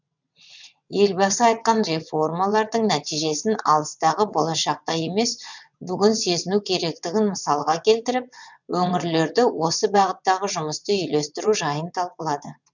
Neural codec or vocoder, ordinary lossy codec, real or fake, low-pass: vocoder, 22.05 kHz, 80 mel bands, WaveNeXt; none; fake; 7.2 kHz